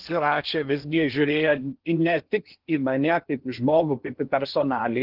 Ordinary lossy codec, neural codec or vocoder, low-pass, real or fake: Opus, 16 kbps; codec, 16 kHz in and 24 kHz out, 0.8 kbps, FocalCodec, streaming, 65536 codes; 5.4 kHz; fake